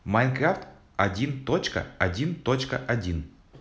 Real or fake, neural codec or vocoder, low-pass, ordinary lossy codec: real; none; none; none